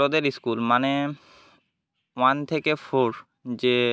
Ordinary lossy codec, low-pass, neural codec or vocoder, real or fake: none; none; none; real